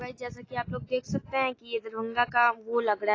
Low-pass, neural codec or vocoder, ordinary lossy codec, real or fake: 7.2 kHz; none; AAC, 32 kbps; real